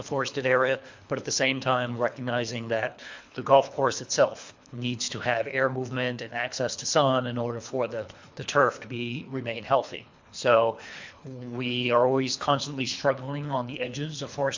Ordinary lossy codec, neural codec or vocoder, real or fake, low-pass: MP3, 64 kbps; codec, 24 kHz, 3 kbps, HILCodec; fake; 7.2 kHz